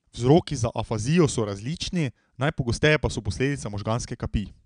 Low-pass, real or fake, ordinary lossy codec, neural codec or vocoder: 9.9 kHz; real; none; none